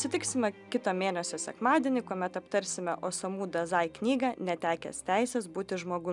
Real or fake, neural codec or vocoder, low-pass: real; none; 10.8 kHz